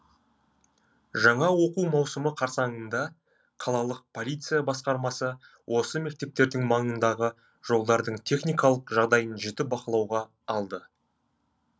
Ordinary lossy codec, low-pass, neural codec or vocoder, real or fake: none; none; none; real